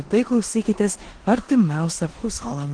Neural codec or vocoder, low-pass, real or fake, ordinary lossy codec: codec, 16 kHz in and 24 kHz out, 0.9 kbps, LongCat-Audio-Codec, four codebook decoder; 9.9 kHz; fake; Opus, 16 kbps